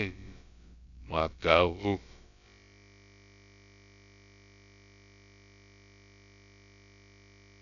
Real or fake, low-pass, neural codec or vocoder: fake; 7.2 kHz; codec, 16 kHz, about 1 kbps, DyCAST, with the encoder's durations